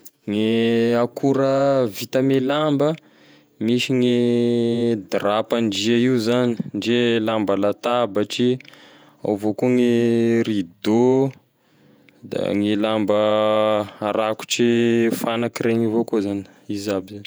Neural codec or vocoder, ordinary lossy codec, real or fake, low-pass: vocoder, 48 kHz, 128 mel bands, Vocos; none; fake; none